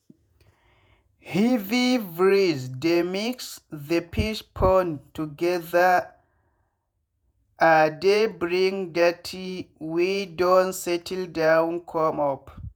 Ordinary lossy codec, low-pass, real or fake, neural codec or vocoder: none; none; fake; vocoder, 48 kHz, 128 mel bands, Vocos